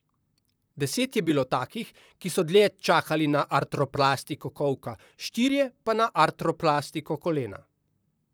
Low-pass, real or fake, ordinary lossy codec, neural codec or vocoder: none; fake; none; vocoder, 44.1 kHz, 128 mel bands, Pupu-Vocoder